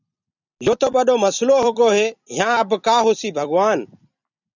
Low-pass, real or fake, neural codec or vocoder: 7.2 kHz; fake; vocoder, 44.1 kHz, 128 mel bands every 256 samples, BigVGAN v2